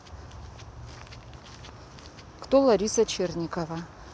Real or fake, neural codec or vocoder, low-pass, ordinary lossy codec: real; none; none; none